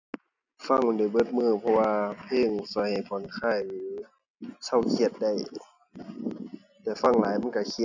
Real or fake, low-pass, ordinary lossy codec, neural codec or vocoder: real; 7.2 kHz; none; none